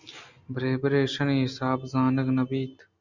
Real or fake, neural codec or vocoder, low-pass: real; none; 7.2 kHz